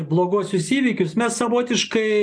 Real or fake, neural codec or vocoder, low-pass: real; none; 9.9 kHz